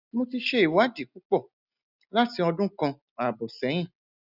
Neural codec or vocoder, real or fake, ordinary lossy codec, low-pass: none; real; none; 5.4 kHz